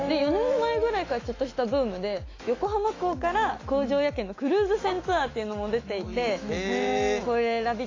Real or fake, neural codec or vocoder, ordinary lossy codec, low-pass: fake; autoencoder, 48 kHz, 128 numbers a frame, DAC-VAE, trained on Japanese speech; AAC, 32 kbps; 7.2 kHz